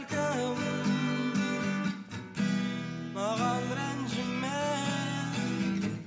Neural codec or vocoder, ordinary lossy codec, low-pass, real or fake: none; none; none; real